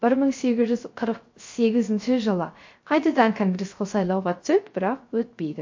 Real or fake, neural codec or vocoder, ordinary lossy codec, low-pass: fake; codec, 16 kHz, 0.3 kbps, FocalCodec; MP3, 48 kbps; 7.2 kHz